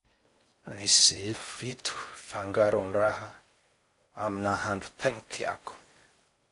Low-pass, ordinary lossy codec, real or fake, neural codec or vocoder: 10.8 kHz; AAC, 48 kbps; fake; codec, 16 kHz in and 24 kHz out, 0.6 kbps, FocalCodec, streaming, 4096 codes